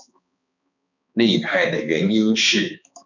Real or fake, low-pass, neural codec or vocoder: fake; 7.2 kHz; codec, 16 kHz, 2 kbps, X-Codec, HuBERT features, trained on balanced general audio